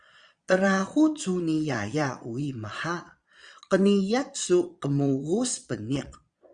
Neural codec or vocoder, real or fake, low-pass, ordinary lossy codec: vocoder, 22.05 kHz, 80 mel bands, Vocos; fake; 9.9 kHz; Opus, 64 kbps